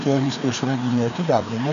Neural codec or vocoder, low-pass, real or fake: codec, 16 kHz, 2 kbps, FreqCodec, larger model; 7.2 kHz; fake